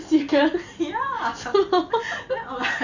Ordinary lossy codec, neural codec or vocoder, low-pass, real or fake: none; none; 7.2 kHz; real